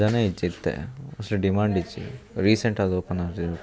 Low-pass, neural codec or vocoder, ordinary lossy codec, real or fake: none; none; none; real